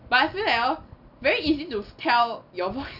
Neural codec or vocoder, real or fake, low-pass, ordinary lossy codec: none; real; 5.4 kHz; none